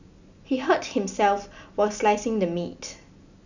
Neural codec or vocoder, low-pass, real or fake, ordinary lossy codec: none; 7.2 kHz; real; none